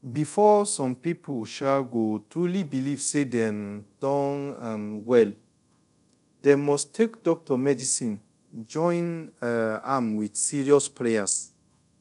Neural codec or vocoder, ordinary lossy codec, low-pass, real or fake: codec, 24 kHz, 0.5 kbps, DualCodec; none; 10.8 kHz; fake